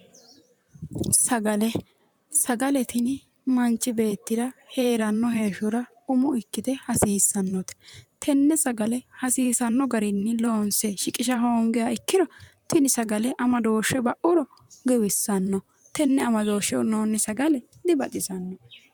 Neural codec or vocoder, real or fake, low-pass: vocoder, 44.1 kHz, 128 mel bands, Pupu-Vocoder; fake; 19.8 kHz